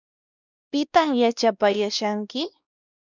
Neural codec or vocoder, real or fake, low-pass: codec, 16 kHz, 1 kbps, X-Codec, WavLM features, trained on Multilingual LibriSpeech; fake; 7.2 kHz